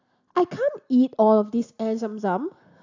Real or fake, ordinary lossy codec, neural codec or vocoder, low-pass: real; AAC, 48 kbps; none; 7.2 kHz